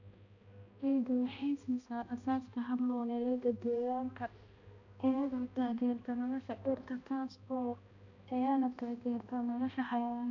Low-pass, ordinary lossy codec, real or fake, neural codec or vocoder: 7.2 kHz; none; fake; codec, 16 kHz, 1 kbps, X-Codec, HuBERT features, trained on balanced general audio